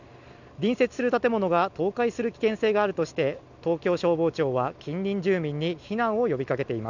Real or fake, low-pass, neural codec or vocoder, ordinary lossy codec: real; 7.2 kHz; none; none